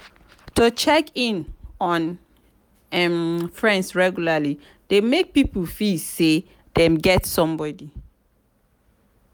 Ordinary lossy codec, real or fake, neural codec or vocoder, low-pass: none; real; none; none